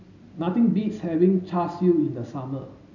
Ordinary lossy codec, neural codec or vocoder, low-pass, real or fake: none; none; 7.2 kHz; real